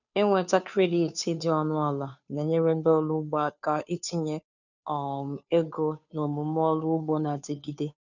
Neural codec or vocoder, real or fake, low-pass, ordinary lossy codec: codec, 16 kHz, 2 kbps, FunCodec, trained on Chinese and English, 25 frames a second; fake; 7.2 kHz; none